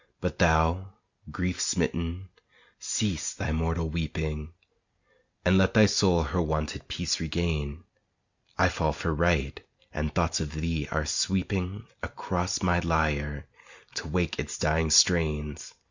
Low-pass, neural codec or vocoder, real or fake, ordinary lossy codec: 7.2 kHz; none; real; Opus, 64 kbps